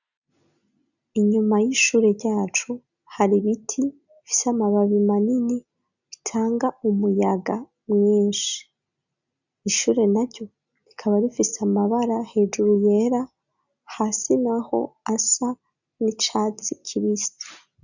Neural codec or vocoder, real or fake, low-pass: none; real; 7.2 kHz